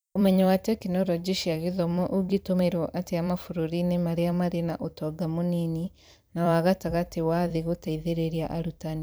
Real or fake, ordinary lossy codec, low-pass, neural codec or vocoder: fake; none; none; vocoder, 44.1 kHz, 128 mel bands every 256 samples, BigVGAN v2